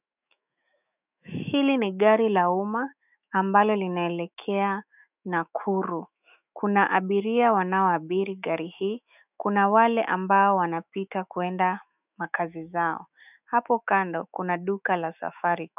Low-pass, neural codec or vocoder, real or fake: 3.6 kHz; autoencoder, 48 kHz, 128 numbers a frame, DAC-VAE, trained on Japanese speech; fake